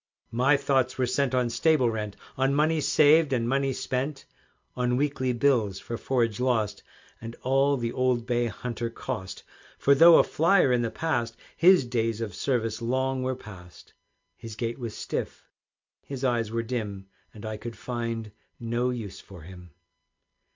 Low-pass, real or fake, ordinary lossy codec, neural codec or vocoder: 7.2 kHz; real; MP3, 64 kbps; none